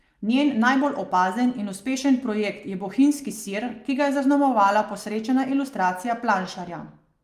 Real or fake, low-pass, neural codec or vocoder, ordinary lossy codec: real; 14.4 kHz; none; Opus, 32 kbps